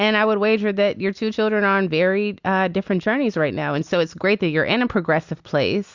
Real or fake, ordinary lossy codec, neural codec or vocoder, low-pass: real; Opus, 64 kbps; none; 7.2 kHz